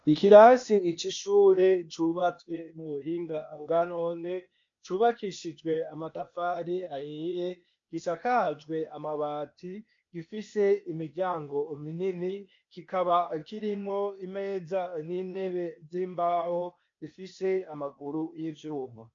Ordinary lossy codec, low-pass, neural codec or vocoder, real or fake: MP3, 48 kbps; 7.2 kHz; codec, 16 kHz, 0.8 kbps, ZipCodec; fake